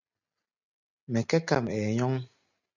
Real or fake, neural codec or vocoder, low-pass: real; none; 7.2 kHz